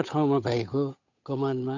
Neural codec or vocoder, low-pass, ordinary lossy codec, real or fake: codec, 16 kHz, 8 kbps, FunCodec, trained on Chinese and English, 25 frames a second; 7.2 kHz; none; fake